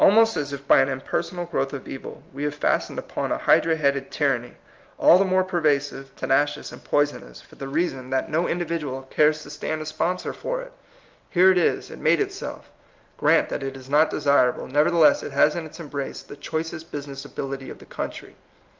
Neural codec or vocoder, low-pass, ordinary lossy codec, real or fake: none; 7.2 kHz; Opus, 24 kbps; real